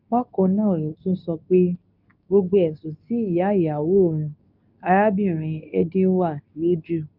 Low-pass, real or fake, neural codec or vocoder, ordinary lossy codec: 5.4 kHz; fake; codec, 24 kHz, 0.9 kbps, WavTokenizer, medium speech release version 2; none